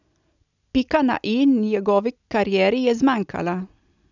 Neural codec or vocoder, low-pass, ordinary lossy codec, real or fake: none; 7.2 kHz; none; real